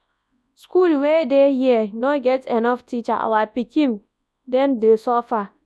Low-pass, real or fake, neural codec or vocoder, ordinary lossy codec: none; fake; codec, 24 kHz, 0.9 kbps, WavTokenizer, large speech release; none